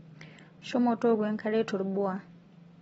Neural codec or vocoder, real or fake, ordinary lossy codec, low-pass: none; real; AAC, 24 kbps; 19.8 kHz